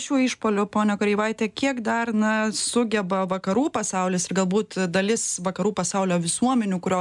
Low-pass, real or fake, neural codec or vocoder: 10.8 kHz; real; none